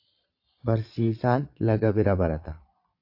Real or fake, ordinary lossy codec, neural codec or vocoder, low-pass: fake; AAC, 48 kbps; vocoder, 22.05 kHz, 80 mel bands, Vocos; 5.4 kHz